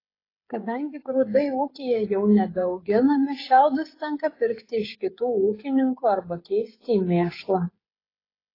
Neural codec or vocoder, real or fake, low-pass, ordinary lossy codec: codec, 16 kHz, 16 kbps, FreqCodec, smaller model; fake; 5.4 kHz; AAC, 24 kbps